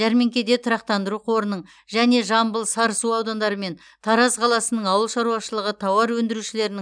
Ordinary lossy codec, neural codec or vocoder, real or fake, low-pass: none; none; real; 9.9 kHz